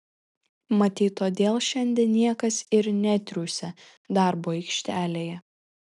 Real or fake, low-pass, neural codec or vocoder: real; 10.8 kHz; none